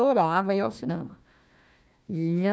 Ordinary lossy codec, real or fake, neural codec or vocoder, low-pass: none; fake; codec, 16 kHz, 1 kbps, FunCodec, trained on Chinese and English, 50 frames a second; none